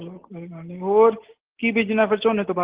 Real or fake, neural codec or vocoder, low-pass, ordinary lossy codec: real; none; 3.6 kHz; Opus, 16 kbps